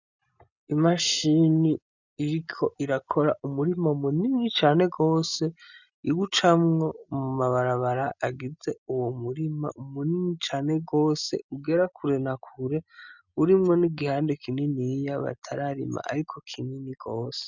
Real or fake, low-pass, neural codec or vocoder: real; 7.2 kHz; none